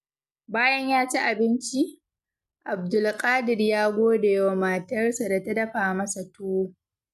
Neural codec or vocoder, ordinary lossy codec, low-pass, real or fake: none; none; 14.4 kHz; real